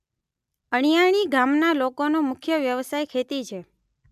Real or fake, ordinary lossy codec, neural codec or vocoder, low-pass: real; MP3, 96 kbps; none; 14.4 kHz